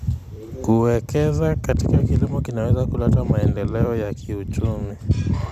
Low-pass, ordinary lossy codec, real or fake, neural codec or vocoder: 14.4 kHz; none; fake; vocoder, 44.1 kHz, 128 mel bands every 256 samples, BigVGAN v2